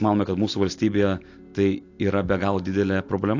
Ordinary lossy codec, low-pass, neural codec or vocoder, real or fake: AAC, 48 kbps; 7.2 kHz; none; real